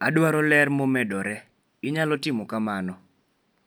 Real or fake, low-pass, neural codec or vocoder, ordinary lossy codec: real; none; none; none